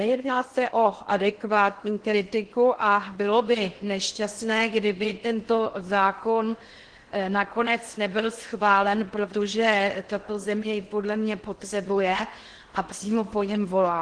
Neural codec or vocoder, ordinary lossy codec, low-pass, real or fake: codec, 16 kHz in and 24 kHz out, 0.6 kbps, FocalCodec, streaming, 2048 codes; Opus, 16 kbps; 9.9 kHz; fake